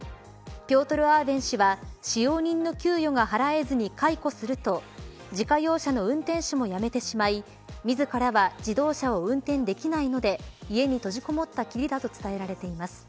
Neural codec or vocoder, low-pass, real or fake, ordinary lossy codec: none; none; real; none